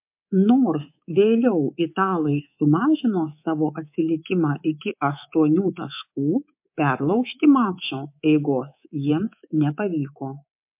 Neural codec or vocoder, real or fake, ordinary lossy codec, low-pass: codec, 24 kHz, 3.1 kbps, DualCodec; fake; MP3, 32 kbps; 3.6 kHz